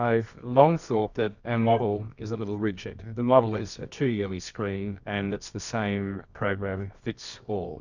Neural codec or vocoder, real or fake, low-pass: codec, 24 kHz, 0.9 kbps, WavTokenizer, medium music audio release; fake; 7.2 kHz